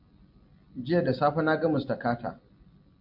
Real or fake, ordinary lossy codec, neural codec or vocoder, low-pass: real; AAC, 48 kbps; none; 5.4 kHz